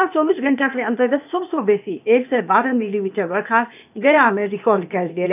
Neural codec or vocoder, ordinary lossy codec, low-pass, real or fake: codec, 16 kHz, 0.8 kbps, ZipCodec; none; 3.6 kHz; fake